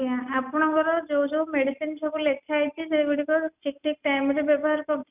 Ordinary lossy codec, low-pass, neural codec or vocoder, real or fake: none; 3.6 kHz; none; real